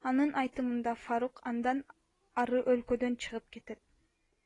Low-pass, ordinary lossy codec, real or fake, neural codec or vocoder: 10.8 kHz; AAC, 32 kbps; real; none